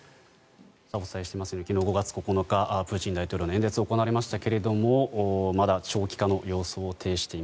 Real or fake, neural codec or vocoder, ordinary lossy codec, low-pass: real; none; none; none